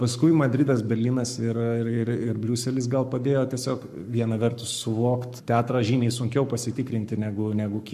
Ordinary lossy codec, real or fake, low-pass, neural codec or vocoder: AAC, 96 kbps; fake; 14.4 kHz; codec, 44.1 kHz, 7.8 kbps, Pupu-Codec